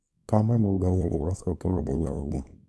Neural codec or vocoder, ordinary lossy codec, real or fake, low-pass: codec, 24 kHz, 0.9 kbps, WavTokenizer, small release; none; fake; none